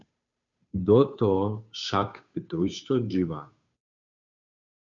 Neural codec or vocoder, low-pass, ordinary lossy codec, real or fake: codec, 16 kHz, 2 kbps, FunCodec, trained on Chinese and English, 25 frames a second; 7.2 kHz; MP3, 64 kbps; fake